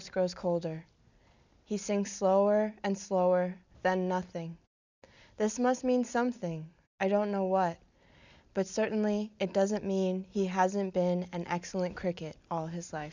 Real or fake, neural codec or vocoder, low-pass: real; none; 7.2 kHz